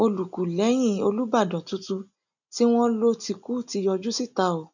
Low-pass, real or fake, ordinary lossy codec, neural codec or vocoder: 7.2 kHz; real; none; none